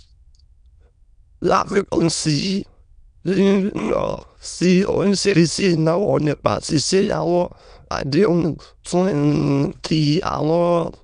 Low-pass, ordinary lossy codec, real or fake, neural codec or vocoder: 9.9 kHz; MP3, 96 kbps; fake; autoencoder, 22.05 kHz, a latent of 192 numbers a frame, VITS, trained on many speakers